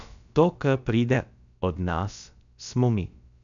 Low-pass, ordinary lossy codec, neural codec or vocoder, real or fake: 7.2 kHz; none; codec, 16 kHz, about 1 kbps, DyCAST, with the encoder's durations; fake